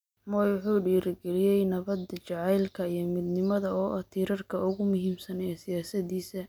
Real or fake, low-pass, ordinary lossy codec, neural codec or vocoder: real; none; none; none